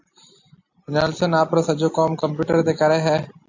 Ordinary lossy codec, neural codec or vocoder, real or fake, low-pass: AAC, 48 kbps; none; real; 7.2 kHz